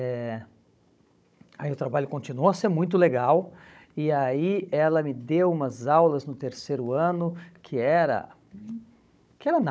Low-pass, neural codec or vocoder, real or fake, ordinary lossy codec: none; codec, 16 kHz, 16 kbps, FunCodec, trained on Chinese and English, 50 frames a second; fake; none